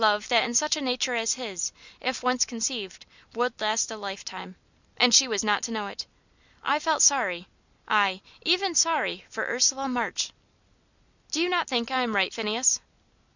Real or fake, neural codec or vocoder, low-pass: real; none; 7.2 kHz